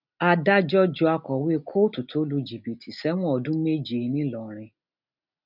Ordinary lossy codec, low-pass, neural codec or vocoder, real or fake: none; 5.4 kHz; none; real